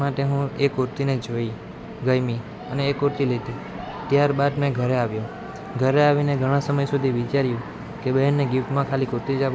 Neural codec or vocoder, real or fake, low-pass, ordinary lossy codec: none; real; none; none